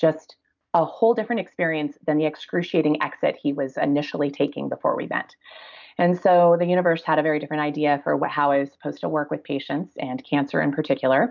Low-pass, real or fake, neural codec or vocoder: 7.2 kHz; real; none